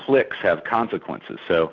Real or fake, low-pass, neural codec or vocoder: real; 7.2 kHz; none